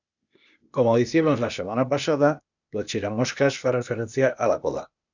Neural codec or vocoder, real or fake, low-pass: codec, 16 kHz, 0.8 kbps, ZipCodec; fake; 7.2 kHz